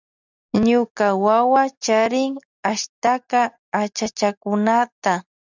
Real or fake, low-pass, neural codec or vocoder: real; 7.2 kHz; none